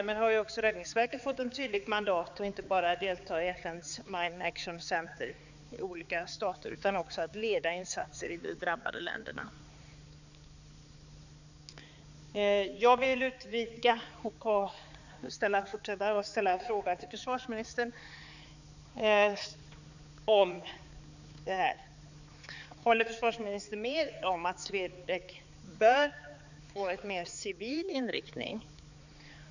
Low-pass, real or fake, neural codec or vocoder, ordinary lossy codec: 7.2 kHz; fake; codec, 16 kHz, 4 kbps, X-Codec, HuBERT features, trained on balanced general audio; none